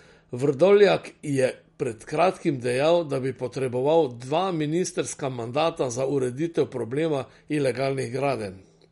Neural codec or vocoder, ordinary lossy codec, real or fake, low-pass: none; MP3, 48 kbps; real; 19.8 kHz